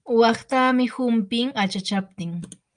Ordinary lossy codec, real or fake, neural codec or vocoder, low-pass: Opus, 32 kbps; real; none; 9.9 kHz